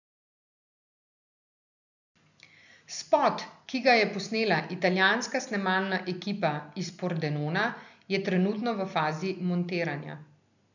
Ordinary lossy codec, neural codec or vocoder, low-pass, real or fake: none; none; 7.2 kHz; real